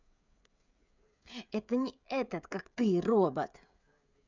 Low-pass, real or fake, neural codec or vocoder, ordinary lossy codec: 7.2 kHz; fake; codec, 16 kHz, 16 kbps, FreqCodec, smaller model; none